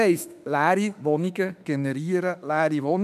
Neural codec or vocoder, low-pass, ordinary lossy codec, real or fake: autoencoder, 48 kHz, 32 numbers a frame, DAC-VAE, trained on Japanese speech; 14.4 kHz; none; fake